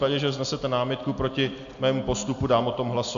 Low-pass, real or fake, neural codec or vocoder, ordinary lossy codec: 7.2 kHz; real; none; AAC, 64 kbps